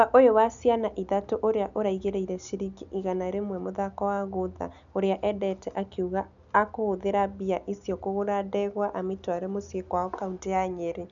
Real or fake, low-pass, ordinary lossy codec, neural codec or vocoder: real; 7.2 kHz; none; none